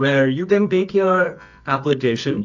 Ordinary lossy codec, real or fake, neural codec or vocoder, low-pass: AAC, 48 kbps; fake; codec, 24 kHz, 0.9 kbps, WavTokenizer, medium music audio release; 7.2 kHz